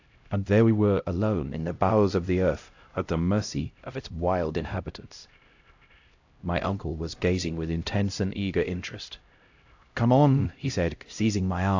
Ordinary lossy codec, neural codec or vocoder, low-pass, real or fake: AAC, 48 kbps; codec, 16 kHz, 0.5 kbps, X-Codec, HuBERT features, trained on LibriSpeech; 7.2 kHz; fake